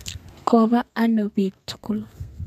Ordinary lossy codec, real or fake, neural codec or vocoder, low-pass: none; fake; codec, 32 kHz, 1.9 kbps, SNAC; 14.4 kHz